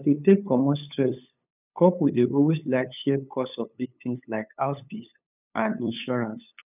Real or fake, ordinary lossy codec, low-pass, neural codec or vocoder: fake; none; 3.6 kHz; codec, 16 kHz, 8 kbps, FunCodec, trained on LibriTTS, 25 frames a second